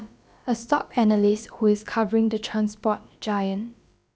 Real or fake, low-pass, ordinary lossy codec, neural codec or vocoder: fake; none; none; codec, 16 kHz, about 1 kbps, DyCAST, with the encoder's durations